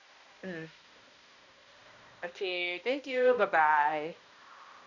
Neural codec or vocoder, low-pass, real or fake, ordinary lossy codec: codec, 16 kHz, 1 kbps, X-Codec, HuBERT features, trained on balanced general audio; 7.2 kHz; fake; none